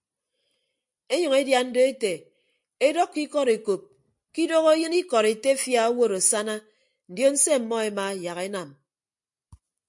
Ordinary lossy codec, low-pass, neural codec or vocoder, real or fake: MP3, 64 kbps; 10.8 kHz; none; real